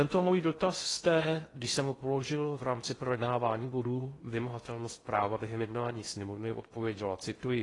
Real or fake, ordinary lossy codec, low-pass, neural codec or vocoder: fake; AAC, 32 kbps; 10.8 kHz; codec, 16 kHz in and 24 kHz out, 0.6 kbps, FocalCodec, streaming, 2048 codes